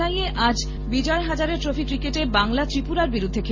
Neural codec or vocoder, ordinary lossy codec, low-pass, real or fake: none; MP3, 32 kbps; 7.2 kHz; real